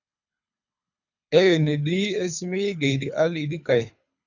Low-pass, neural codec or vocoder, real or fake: 7.2 kHz; codec, 24 kHz, 3 kbps, HILCodec; fake